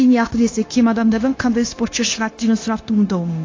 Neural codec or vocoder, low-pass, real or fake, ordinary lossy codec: codec, 16 kHz, 0.9 kbps, LongCat-Audio-Codec; 7.2 kHz; fake; AAC, 32 kbps